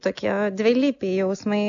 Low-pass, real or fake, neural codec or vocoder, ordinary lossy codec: 7.2 kHz; real; none; AAC, 64 kbps